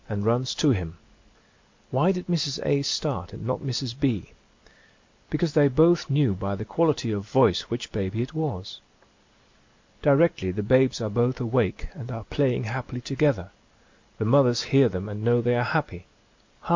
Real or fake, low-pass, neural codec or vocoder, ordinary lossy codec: real; 7.2 kHz; none; MP3, 48 kbps